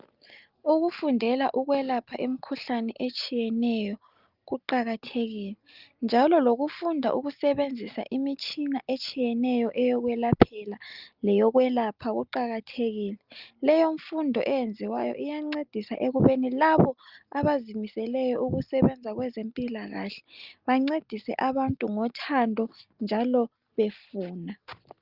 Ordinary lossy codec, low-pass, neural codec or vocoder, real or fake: Opus, 24 kbps; 5.4 kHz; none; real